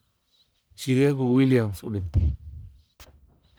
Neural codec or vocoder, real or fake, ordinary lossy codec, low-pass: codec, 44.1 kHz, 1.7 kbps, Pupu-Codec; fake; none; none